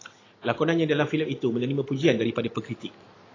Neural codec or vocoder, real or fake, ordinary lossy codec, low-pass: none; real; AAC, 32 kbps; 7.2 kHz